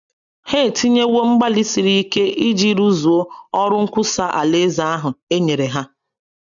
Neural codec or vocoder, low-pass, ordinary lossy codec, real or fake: none; 7.2 kHz; none; real